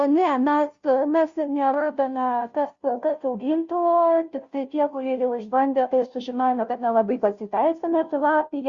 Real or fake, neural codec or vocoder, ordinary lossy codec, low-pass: fake; codec, 16 kHz, 0.5 kbps, FunCodec, trained on Chinese and English, 25 frames a second; Opus, 64 kbps; 7.2 kHz